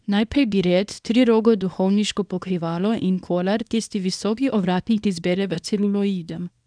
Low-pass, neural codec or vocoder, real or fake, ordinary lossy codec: 9.9 kHz; codec, 24 kHz, 0.9 kbps, WavTokenizer, small release; fake; none